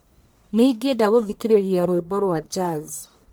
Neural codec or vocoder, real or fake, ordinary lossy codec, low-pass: codec, 44.1 kHz, 1.7 kbps, Pupu-Codec; fake; none; none